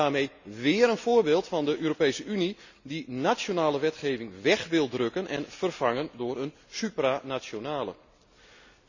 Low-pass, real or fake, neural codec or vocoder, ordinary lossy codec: 7.2 kHz; real; none; none